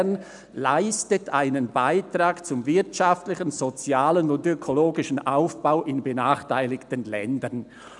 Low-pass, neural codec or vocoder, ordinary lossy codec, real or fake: 10.8 kHz; none; none; real